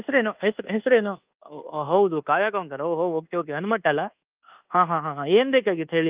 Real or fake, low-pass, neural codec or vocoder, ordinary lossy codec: fake; 3.6 kHz; codec, 24 kHz, 1.2 kbps, DualCodec; Opus, 32 kbps